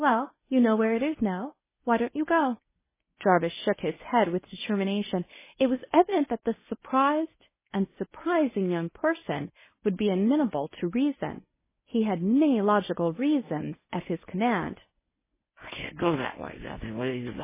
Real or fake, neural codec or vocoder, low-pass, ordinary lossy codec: fake; codec, 24 kHz, 0.9 kbps, WavTokenizer, medium speech release version 1; 3.6 kHz; MP3, 16 kbps